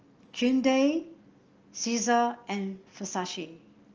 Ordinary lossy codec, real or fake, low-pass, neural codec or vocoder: Opus, 24 kbps; real; 7.2 kHz; none